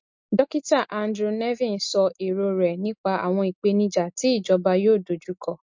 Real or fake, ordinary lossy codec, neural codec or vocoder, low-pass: real; MP3, 48 kbps; none; 7.2 kHz